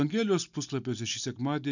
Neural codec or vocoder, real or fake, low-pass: none; real; 7.2 kHz